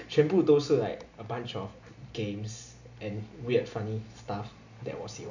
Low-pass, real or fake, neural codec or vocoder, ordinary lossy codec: 7.2 kHz; real; none; none